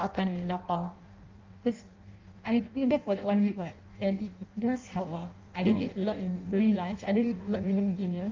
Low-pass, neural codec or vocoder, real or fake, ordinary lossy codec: 7.2 kHz; codec, 16 kHz in and 24 kHz out, 0.6 kbps, FireRedTTS-2 codec; fake; Opus, 32 kbps